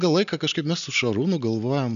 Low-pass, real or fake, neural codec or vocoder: 7.2 kHz; real; none